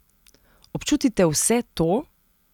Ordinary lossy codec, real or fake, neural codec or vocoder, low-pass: none; real; none; 19.8 kHz